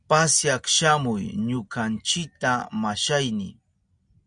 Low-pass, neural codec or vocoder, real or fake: 9.9 kHz; none; real